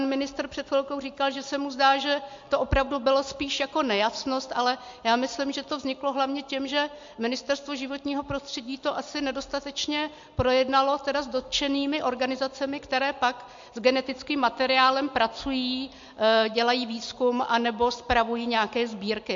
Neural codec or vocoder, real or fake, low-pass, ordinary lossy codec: none; real; 7.2 kHz; MP3, 48 kbps